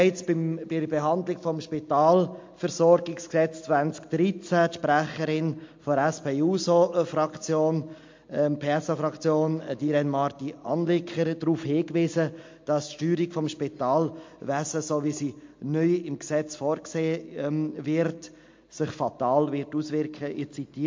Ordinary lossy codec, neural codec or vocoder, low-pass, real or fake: MP3, 48 kbps; none; 7.2 kHz; real